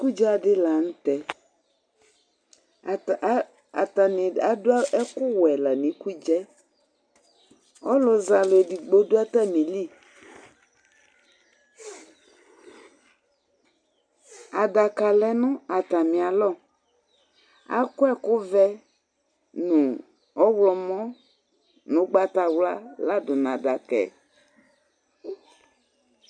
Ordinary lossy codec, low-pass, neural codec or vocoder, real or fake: MP3, 96 kbps; 9.9 kHz; none; real